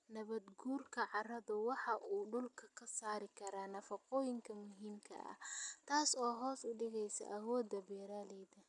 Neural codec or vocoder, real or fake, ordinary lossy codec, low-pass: none; real; none; 10.8 kHz